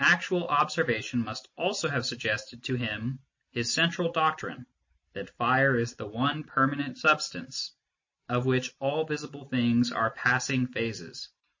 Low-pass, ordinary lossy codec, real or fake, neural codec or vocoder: 7.2 kHz; MP3, 32 kbps; real; none